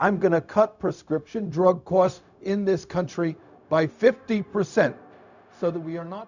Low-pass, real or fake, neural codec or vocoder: 7.2 kHz; fake; codec, 16 kHz, 0.4 kbps, LongCat-Audio-Codec